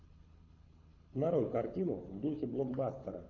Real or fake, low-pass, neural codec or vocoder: fake; 7.2 kHz; codec, 24 kHz, 6 kbps, HILCodec